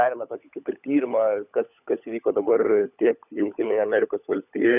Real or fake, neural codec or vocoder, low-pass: fake; codec, 16 kHz, 16 kbps, FunCodec, trained on LibriTTS, 50 frames a second; 3.6 kHz